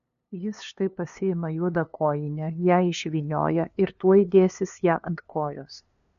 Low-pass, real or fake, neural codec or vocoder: 7.2 kHz; fake; codec, 16 kHz, 2 kbps, FunCodec, trained on LibriTTS, 25 frames a second